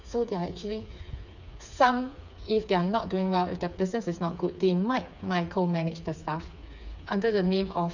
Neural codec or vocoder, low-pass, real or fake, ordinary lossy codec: codec, 16 kHz, 4 kbps, FreqCodec, smaller model; 7.2 kHz; fake; none